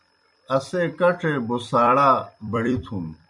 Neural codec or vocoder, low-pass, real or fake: vocoder, 44.1 kHz, 128 mel bands every 256 samples, BigVGAN v2; 10.8 kHz; fake